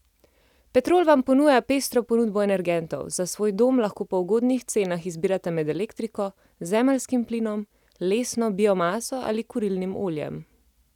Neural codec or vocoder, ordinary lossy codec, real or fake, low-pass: none; none; real; 19.8 kHz